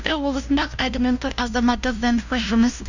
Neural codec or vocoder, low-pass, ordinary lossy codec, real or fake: codec, 16 kHz, 0.5 kbps, FunCodec, trained on LibriTTS, 25 frames a second; 7.2 kHz; none; fake